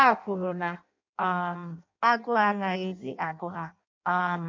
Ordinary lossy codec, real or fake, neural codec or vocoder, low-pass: MP3, 48 kbps; fake; codec, 16 kHz in and 24 kHz out, 0.6 kbps, FireRedTTS-2 codec; 7.2 kHz